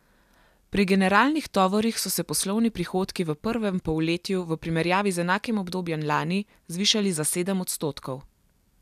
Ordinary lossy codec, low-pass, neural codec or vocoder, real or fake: none; 14.4 kHz; none; real